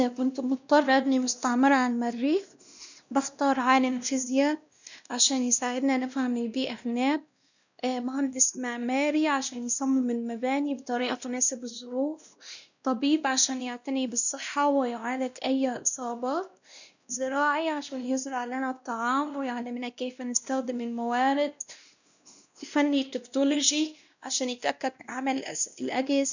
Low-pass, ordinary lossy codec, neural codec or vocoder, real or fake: 7.2 kHz; none; codec, 16 kHz, 1 kbps, X-Codec, WavLM features, trained on Multilingual LibriSpeech; fake